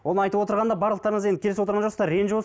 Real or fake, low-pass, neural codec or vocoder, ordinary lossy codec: real; none; none; none